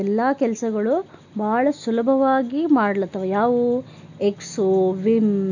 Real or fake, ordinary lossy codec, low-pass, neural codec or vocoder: real; none; 7.2 kHz; none